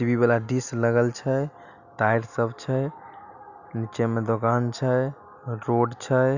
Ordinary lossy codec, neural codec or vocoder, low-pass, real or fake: none; none; 7.2 kHz; real